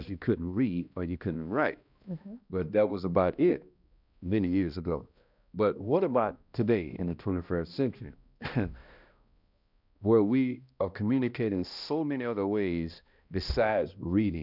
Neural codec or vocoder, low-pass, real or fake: codec, 16 kHz, 1 kbps, X-Codec, HuBERT features, trained on balanced general audio; 5.4 kHz; fake